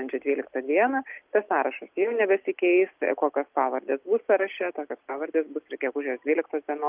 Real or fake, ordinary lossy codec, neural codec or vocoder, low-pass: real; Opus, 32 kbps; none; 3.6 kHz